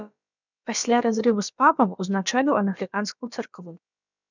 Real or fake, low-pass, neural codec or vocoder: fake; 7.2 kHz; codec, 16 kHz, about 1 kbps, DyCAST, with the encoder's durations